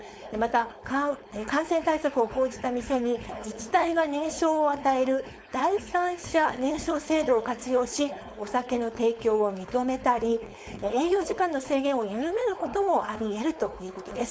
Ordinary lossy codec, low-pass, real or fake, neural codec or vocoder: none; none; fake; codec, 16 kHz, 4.8 kbps, FACodec